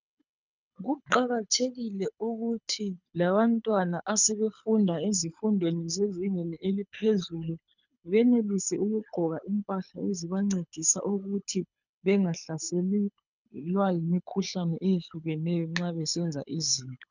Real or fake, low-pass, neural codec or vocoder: fake; 7.2 kHz; codec, 24 kHz, 6 kbps, HILCodec